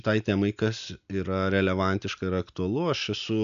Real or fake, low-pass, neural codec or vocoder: real; 7.2 kHz; none